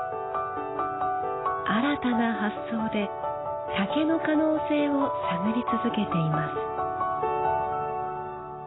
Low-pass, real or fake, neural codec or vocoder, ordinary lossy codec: 7.2 kHz; real; none; AAC, 16 kbps